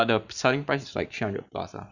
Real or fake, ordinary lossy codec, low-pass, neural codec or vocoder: fake; none; 7.2 kHz; vocoder, 44.1 kHz, 128 mel bands every 512 samples, BigVGAN v2